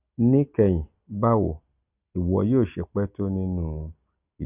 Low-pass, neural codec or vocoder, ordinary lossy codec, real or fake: 3.6 kHz; none; none; real